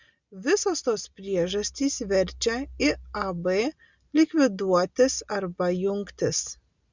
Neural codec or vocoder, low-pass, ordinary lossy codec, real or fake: none; 7.2 kHz; Opus, 64 kbps; real